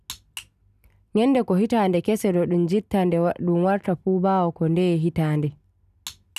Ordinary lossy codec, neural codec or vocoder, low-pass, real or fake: none; none; 14.4 kHz; real